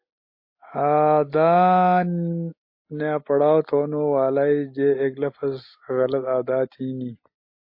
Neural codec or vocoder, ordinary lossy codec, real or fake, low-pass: none; MP3, 32 kbps; real; 5.4 kHz